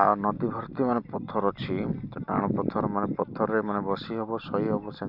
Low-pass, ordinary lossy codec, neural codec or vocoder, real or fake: 5.4 kHz; none; none; real